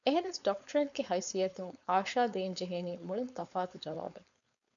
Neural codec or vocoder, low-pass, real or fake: codec, 16 kHz, 4.8 kbps, FACodec; 7.2 kHz; fake